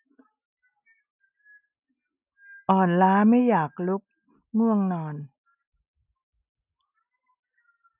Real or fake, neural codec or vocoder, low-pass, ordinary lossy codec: real; none; 3.6 kHz; none